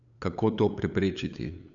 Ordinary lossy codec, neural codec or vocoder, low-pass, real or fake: none; codec, 16 kHz, 8 kbps, FunCodec, trained on LibriTTS, 25 frames a second; 7.2 kHz; fake